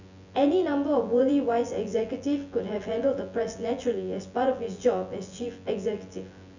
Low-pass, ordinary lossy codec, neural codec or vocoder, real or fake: 7.2 kHz; none; vocoder, 24 kHz, 100 mel bands, Vocos; fake